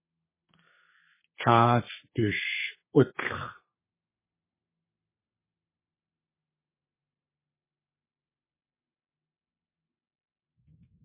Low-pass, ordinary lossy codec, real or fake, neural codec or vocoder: 3.6 kHz; MP3, 16 kbps; fake; codec, 32 kHz, 1.9 kbps, SNAC